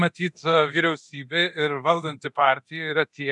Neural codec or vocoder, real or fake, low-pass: codec, 24 kHz, 0.9 kbps, DualCodec; fake; 10.8 kHz